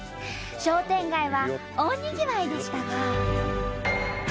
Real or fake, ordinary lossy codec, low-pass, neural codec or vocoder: real; none; none; none